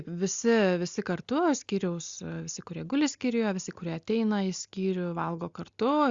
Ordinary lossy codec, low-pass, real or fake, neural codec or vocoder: Opus, 64 kbps; 7.2 kHz; real; none